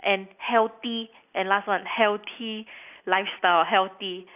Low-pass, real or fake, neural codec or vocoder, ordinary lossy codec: 3.6 kHz; real; none; none